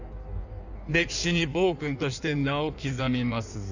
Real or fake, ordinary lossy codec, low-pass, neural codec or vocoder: fake; none; 7.2 kHz; codec, 16 kHz in and 24 kHz out, 1.1 kbps, FireRedTTS-2 codec